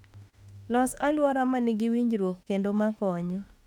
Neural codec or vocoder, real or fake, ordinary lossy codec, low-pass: autoencoder, 48 kHz, 32 numbers a frame, DAC-VAE, trained on Japanese speech; fake; none; 19.8 kHz